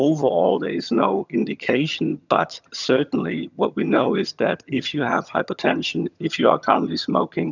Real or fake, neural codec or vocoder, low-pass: fake; vocoder, 22.05 kHz, 80 mel bands, HiFi-GAN; 7.2 kHz